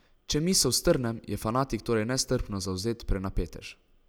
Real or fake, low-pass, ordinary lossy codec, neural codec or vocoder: real; none; none; none